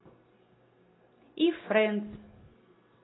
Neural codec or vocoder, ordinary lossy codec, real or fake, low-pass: none; AAC, 16 kbps; real; 7.2 kHz